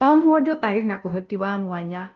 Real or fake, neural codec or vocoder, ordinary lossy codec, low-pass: fake; codec, 16 kHz, 0.5 kbps, FunCodec, trained on Chinese and English, 25 frames a second; Opus, 32 kbps; 7.2 kHz